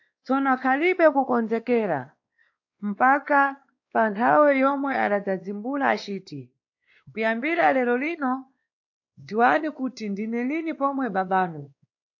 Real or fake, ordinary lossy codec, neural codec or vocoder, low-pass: fake; AAC, 48 kbps; codec, 16 kHz, 2 kbps, X-Codec, WavLM features, trained on Multilingual LibriSpeech; 7.2 kHz